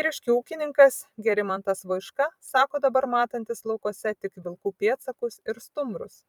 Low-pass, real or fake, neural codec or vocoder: 19.8 kHz; fake; vocoder, 44.1 kHz, 128 mel bands every 256 samples, BigVGAN v2